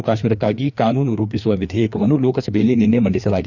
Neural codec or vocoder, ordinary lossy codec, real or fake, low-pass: codec, 16 kHz, 2 kbps, FreqCodec, larger model; none; fake; 7.2 kHz